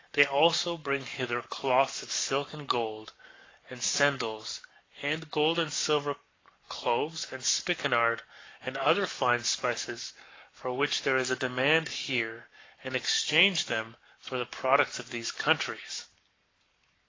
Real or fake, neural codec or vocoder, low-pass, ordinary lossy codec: fake; codec, 44.1 kHz, 7.8 kbps, Pupu-Codec; 7.2 kHz; AAC, 32 kbps